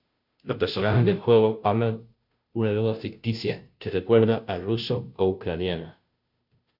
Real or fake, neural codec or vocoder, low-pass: fake; codec, 16 kHz, 0.5 kbps, FunCodec, trained on Chinese and English, 25 frames a second; 5.4 kHz